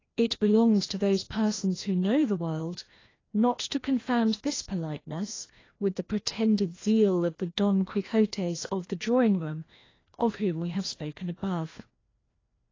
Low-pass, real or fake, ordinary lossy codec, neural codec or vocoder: 7.2 kHz; fake; AAC, 32 kbps; codec, 16 kHz, 1 kbps, FreqCodec, larger model